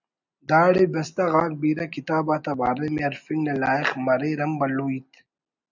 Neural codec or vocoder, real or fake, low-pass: none; real; 7.2 kHz